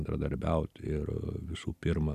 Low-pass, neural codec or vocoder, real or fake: 14.4 kHz; none; real